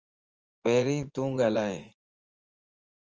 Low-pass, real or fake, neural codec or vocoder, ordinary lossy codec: 7.2 kHz; fake; vocoder, 24 kHz, 100 mel bands, Vocos; Opus, 24 kbps